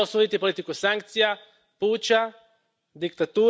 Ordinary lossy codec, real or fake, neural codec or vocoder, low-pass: none; real; none; none